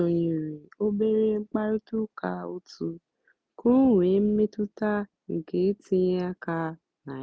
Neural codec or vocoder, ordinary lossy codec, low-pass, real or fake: none; none; none; real